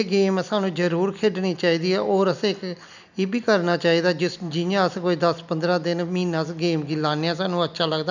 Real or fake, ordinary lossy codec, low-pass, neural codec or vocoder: real; none; 7.2 kHz; none